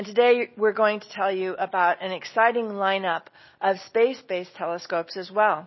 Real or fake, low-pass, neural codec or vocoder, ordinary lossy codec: real; 7.2 kHz; none; MP3, 24 kbps